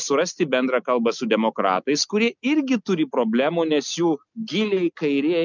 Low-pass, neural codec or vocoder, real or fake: 7.2 kHz; none; real